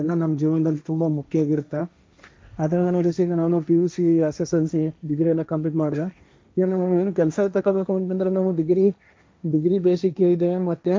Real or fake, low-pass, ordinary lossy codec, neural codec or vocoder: fake; none; none; codec, 16 kHz, 1.1 kbps, Voila-Tokenizer